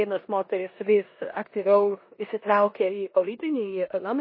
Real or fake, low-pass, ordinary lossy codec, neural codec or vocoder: fake; 5.4 kHz; MP3, 24 kbps; codec, 16 kHz in and 24 kHz out, 0.9 kbps, LongCat-Audio-Codec, four codebook decoder